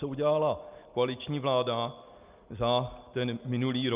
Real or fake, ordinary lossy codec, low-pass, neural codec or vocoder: real; Opus, 24 kbps; 3.6 kHz; none